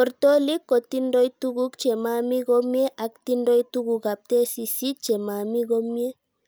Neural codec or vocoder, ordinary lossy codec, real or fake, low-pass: none; none; real; none